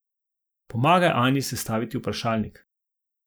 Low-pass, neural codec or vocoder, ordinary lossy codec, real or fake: none; none; none; real